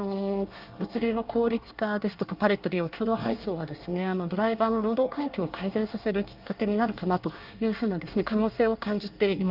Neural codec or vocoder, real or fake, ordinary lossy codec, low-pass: codec, 24 kHz, 1 kbps, SNAC; fake; Opus, 24 kbps; 5.4 kHz